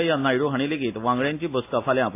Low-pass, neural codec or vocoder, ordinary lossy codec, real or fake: 3.6 kHz; none; AAC, 24 kbps; real